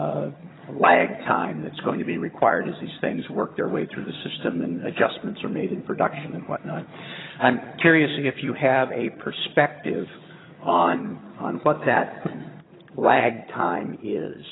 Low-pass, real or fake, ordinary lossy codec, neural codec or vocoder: 7.2 kHz; fake; AAC, 16 kbps; vocoder, 22.05 kHz, 80 mel bands, HiFi-GAN